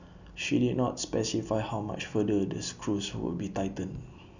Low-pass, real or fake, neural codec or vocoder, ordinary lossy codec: 7.2 kHz; real; none; none